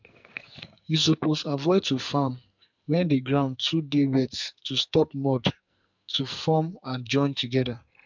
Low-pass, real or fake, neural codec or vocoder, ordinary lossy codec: 7.2 kHz; fake; codec, 44.1 kHz, 2.6 kbps, SNAC; MP3, 64 kbps